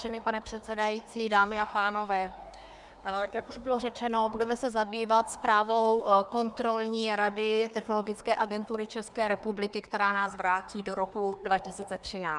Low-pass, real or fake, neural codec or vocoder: 10.8 kHz; fake; codec, 24 kHz, 1 kbps, SNAC